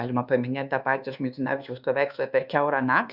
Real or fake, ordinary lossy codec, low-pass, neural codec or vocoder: fake; Opus, 64 kbps; 5.4 kHz; codec, 24 kHz, 1.2 kbps, DualCodec